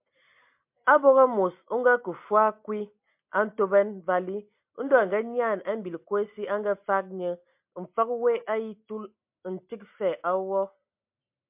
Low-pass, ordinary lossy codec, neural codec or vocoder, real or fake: 3.6 kHz; MP3, 32 kbps; none; real